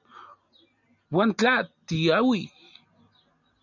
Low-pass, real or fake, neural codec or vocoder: 7.2 kHz; real; none